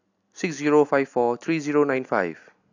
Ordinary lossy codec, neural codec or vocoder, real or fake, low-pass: AAC, 48 kbps; none; real; 7.2 kHz